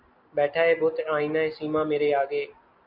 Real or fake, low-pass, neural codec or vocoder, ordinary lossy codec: real; 5.4 kHz; none; AAC, 48 kbps